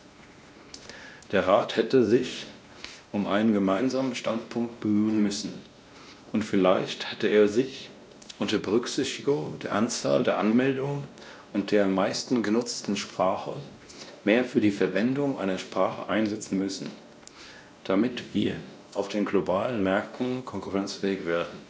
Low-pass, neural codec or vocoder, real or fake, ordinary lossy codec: none; codec, 16 kHz, 1 kbps, X-Codec, WavLM features, trained on Multilingual LibriSpeech; fake; none